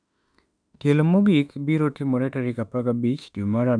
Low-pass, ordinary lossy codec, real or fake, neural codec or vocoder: 9.9 kHz; none; fake; autoencoder, 48 kHz, 32 numbers a frame, DAC-VAE, trained on Japanese speech